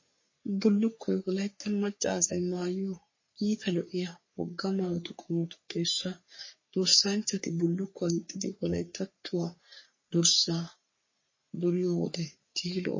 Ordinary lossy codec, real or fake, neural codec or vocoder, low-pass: MP3, 32 kbps; fake; codec, 44.1 kHz, 3.4 kbps, Pupu-Codec; 7.2 kHz